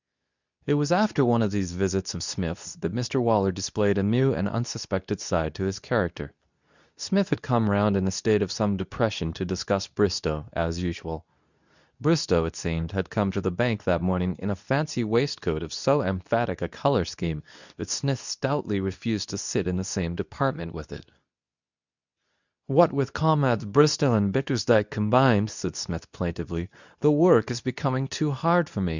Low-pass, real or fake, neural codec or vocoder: 7.2 kHz; fake; codec, 24 kHz, 0.9 kbps, WavTokenizer, medium speech release version 2